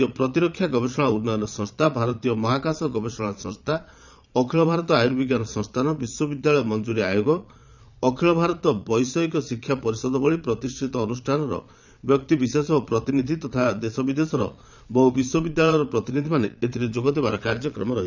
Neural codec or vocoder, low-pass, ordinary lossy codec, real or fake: vocoder, 22.05 kHz, 80 mel bands, Vocos; 7.2 kHz; none; fake